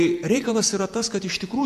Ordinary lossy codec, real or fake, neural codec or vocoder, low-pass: AAC, 96 kbps; fake; vocoder, 48 kHz, 128 mel bands, Vocos; 14.4 kHz